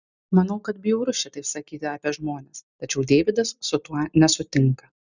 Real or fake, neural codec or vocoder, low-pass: real; none; 7.2 kHz